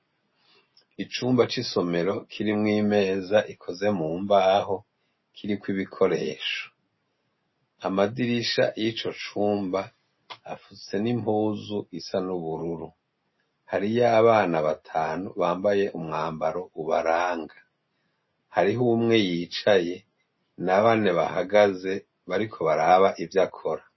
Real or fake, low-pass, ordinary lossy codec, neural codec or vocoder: real; 7.2 kHz; MP3, 24 kbps; none